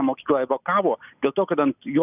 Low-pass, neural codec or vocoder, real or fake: 3.6 kHz; none; real